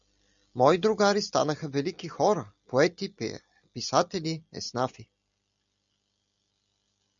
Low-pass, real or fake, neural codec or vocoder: 7.2 kHz; real; none